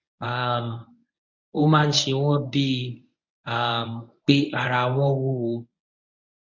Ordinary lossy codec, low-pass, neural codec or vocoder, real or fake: none; 7.2 kHz; codec, 24 kHz, 0.9 kbps, WavTokenizer, medium speech release version 1; fake